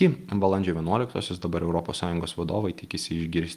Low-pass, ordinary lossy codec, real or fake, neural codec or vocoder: 14.4 kHz; Opus, 32 kbps; real; none